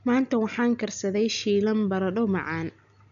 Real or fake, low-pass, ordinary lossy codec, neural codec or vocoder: real; 7.2 kHz; none; none